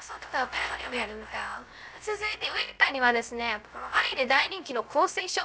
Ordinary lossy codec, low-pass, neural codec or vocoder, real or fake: none; none; codec, 16 kHz, 0.3 kbps, FocalCodec; fake